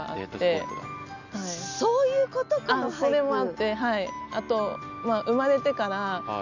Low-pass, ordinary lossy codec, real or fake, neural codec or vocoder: 7.2 kHz; none; real; none